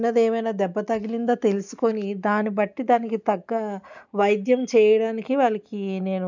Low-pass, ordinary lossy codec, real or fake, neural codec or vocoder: 7.2 kHz; none; real; none